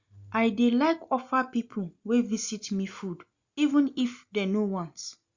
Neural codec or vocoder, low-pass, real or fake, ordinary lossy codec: none; 7.2 kHz; real; Opus, 64 kbps